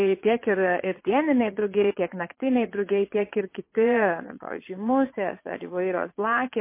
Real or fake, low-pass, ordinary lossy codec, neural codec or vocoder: fake; 3.6 kHz; MP3, 24 kbps; vocoder, 22.05 kHz, 80 mel bands, Vocos